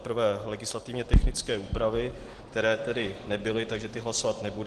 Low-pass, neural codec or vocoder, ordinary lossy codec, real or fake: 10.8 kHz; none; Opus, 16 kbps; real